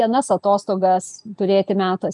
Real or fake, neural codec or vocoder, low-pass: real; none; 10.8 kHz